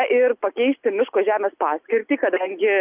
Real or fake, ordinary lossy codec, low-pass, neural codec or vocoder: real; Opus, 24 kbps; 3.6 kHz; none